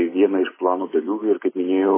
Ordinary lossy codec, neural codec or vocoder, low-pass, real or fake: MP3, 16 kbps; none; 3.6 kHz; real